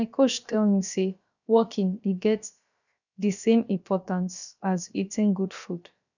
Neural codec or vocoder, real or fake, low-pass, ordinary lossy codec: codec, 16 kHz, about 1 kbps, DyCAST, with the encoder's durations; fake; 7.2 kHz; none